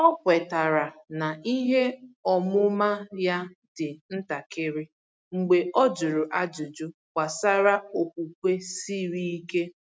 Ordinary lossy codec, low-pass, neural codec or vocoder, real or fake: none; none; none; real